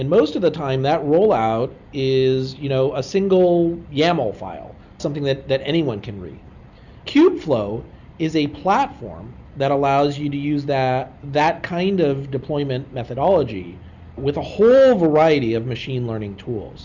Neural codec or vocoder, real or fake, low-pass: none; real; 7.2 kHz